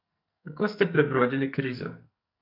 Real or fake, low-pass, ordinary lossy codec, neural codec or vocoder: fake; 5.4 kHz; none; codec, 44.1 kHz, 2.6 kbps, SNAC